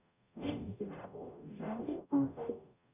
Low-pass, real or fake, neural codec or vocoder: 3.6 kHz; fake; codec, 44.1 kHz, 0.9 kbps, DAC